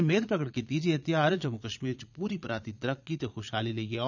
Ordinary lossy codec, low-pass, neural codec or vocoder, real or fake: none; 7.2 kHz; vocoder, 22.05 kHz, 80 mel bands, Vocos; fake